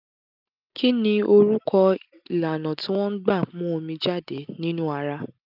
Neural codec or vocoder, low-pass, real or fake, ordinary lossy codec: none; 5.4 kHz; real; none